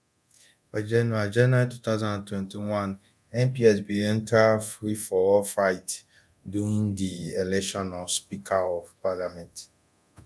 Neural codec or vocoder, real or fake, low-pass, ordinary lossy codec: codec, 24 kHz, 0.9 kbps, DualCodec; fake; none; none